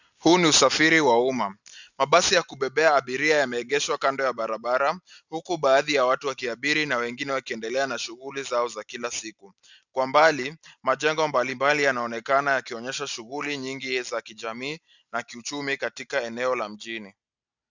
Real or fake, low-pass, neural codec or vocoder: real; 7.2 kHz; none